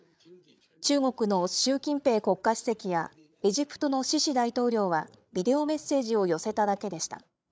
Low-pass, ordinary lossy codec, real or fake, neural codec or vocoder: none; none; fake; codec, 16 kHz, 8 kbps, FreqCodec, larger model